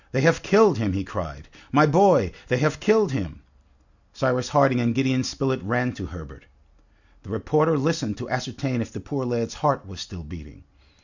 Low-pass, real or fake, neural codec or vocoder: 7.2 kHz; real; none